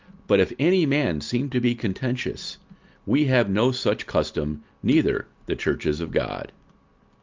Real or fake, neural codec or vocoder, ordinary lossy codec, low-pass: real; none; Opus, 32 kbps; 7.2 kHz